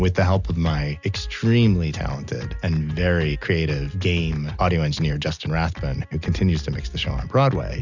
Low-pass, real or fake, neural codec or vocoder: 7.2 kHz; real; none